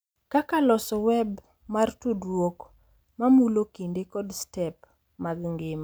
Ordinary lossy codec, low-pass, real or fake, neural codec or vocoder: none; none; real; none